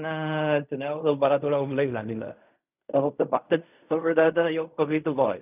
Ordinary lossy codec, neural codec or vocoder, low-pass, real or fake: none; codec, 16 kHz in and 24 kHz out, 0.4 kbps, LongCat-Audio-Codec, fine tuned four codebook decoder; 3.6 kHz; fake